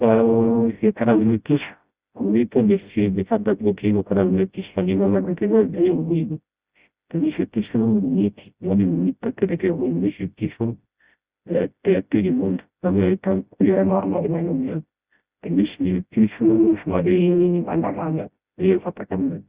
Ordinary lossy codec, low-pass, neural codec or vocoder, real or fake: Opus, 64 kbps; 3.6 kHz; codec, 16 kHz, 0.5 kbps, FreqCodec, smaller model; fake